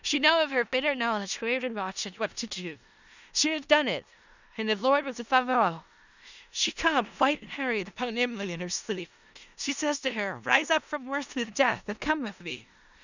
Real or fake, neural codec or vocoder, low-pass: fake; codec, 16 kHz in and 24 kHz out, 0.4 kbps, LongCat-Audio-Codec, four codebook decoder; 7.2 kHz